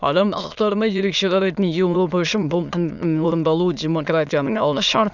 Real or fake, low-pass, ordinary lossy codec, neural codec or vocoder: fake; 7.2 kHz; none; autoencoder, 22.05 kHz, a latent of 192 numbers a frame, VITS, trained on many speakers